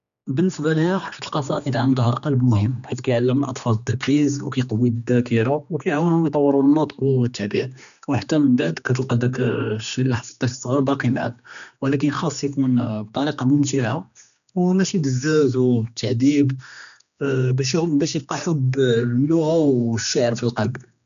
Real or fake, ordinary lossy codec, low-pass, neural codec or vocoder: fake; none; 7.2 kHz; codec, 16 kHz, 2 kbps, X-Codec, HuBERT features, trained on general audio